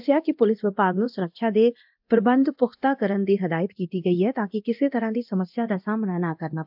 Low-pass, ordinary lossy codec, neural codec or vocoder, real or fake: 5.4 kHz; AAC, 48 kbps; codec, 24 kHz, 0.9 kbps, DualCodec; fake